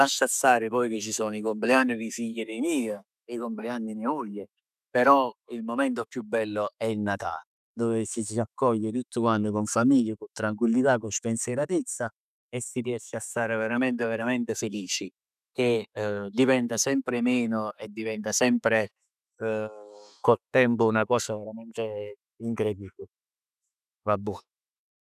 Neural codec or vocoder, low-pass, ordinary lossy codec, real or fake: autoencoder, 48 kHz, 128 numbers a frame, DAC-VAE, trained on Japanese speech; 14.4 kHz; none; fake